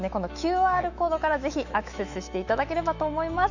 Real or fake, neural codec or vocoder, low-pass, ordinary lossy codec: fake; autoencoder, 48 kHz, 128 numbers a frame, DAC-VAE, trained on Japanese speech; 7.2 kHz; none